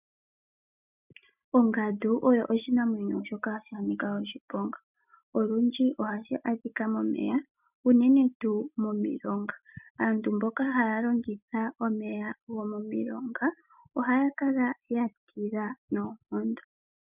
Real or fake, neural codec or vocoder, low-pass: real; none; 3.6 kHz